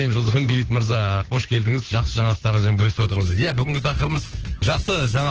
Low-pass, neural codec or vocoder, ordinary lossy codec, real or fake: 7.2 kHz; codec, 16 kHz, 2 kbps, FunCodec, trained on Chinese and English, 25 frames a second; Opus, 16 kbps; fake